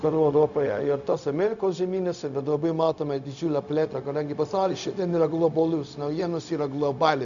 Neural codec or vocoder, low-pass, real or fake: codec, 16 kHz, 0.4 kbps, LongCat-Audio-Codec; 7.2 kHz; fake